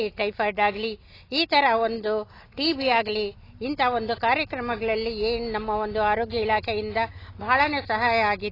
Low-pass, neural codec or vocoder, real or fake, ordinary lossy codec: 5.4 kHz; none; real; AAC, 24 kbps